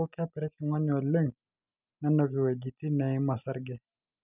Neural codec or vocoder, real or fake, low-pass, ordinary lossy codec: none; real; 3.6 kHz; none